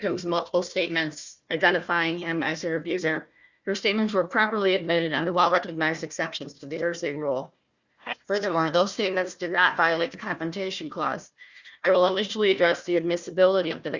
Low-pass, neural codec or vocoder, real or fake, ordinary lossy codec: 7.2 kHz; codec, 16 kHz, 1 kbps, FunCodec, trained on Chinese and English, 50 frames a second; fake; Opus, 64 kbps